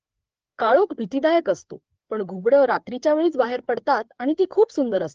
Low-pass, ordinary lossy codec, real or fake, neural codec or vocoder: 7.2 kHz; Opus, 16 kbps; fake; codec, 16 kHz, 4 kbps, FreqCodec, larger model